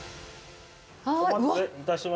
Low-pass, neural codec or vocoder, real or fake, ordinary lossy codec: none; none; real; none